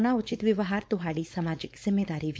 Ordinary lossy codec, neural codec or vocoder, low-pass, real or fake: none; codec, 16 kHz, 4.8 kbps, FACodec; none; fake